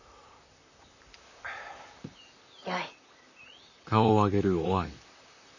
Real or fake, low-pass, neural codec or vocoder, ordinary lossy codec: fake; 7.2 kHz; vocoder, 44.1 kHz, 128 mel bands, Pupu-Vocoder; none